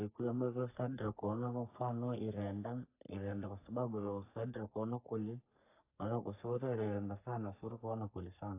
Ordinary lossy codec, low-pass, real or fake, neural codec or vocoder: AAC, 24 kbps; 3.6 kHz; fake; codec, 44.1 kHz, 3.4 kbps, Pupu-Codec